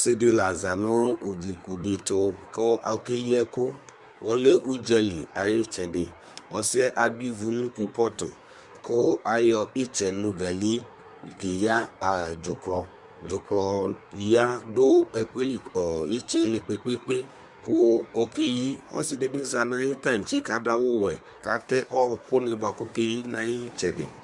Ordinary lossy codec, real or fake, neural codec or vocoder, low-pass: Opus, 64 kbps; fake; codec, 24 kHz, 1 kbps, SNAC; 10.8 kHz